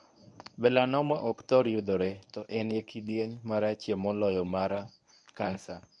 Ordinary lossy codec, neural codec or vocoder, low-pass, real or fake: none; codec, 24 kHz, 0.9 kbps, WavTokenizer, medium speech release version 1; 10.8 kHz; fake